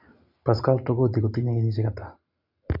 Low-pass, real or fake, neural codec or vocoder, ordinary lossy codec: 5.4 kHz; real; none; none